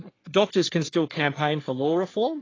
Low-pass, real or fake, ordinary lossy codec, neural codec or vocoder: 7.2 kHz; fake; AAC, 32 kbps; codec, 44.1 kHz, 3.4 kbps, Pupu-Codec